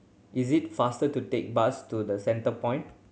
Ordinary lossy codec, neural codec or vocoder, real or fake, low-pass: none; none; real; none